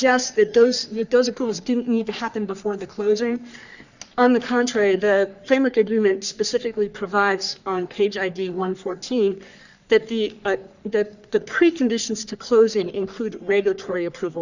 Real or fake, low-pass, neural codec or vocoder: fake; 7.2 kHz; codec, 44.1 kHz, 3.4 kbps, Pupu-Codec